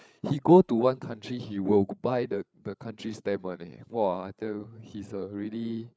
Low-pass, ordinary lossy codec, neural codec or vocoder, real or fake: none; none; codec, 16 kHz, 8 kbps, FreqCodec, larger model; fake